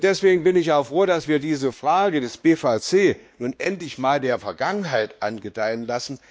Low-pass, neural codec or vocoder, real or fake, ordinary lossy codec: none; codec, 16 kHz, 2 kbps, X-Codec, WavLM features, trained on Multilingual LibriSpeech; fake; none